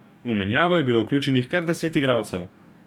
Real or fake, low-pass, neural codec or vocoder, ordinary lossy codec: fake; 19.8 kHz; codec, 44.1 kHz, 2.6 kbps, DAC; none